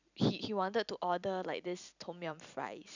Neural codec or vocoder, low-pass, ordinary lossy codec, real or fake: vocoder, 44.1 kHz, 128 mel bands every 256 samples, BigVGAN v2; 7.2 kHz; none; fake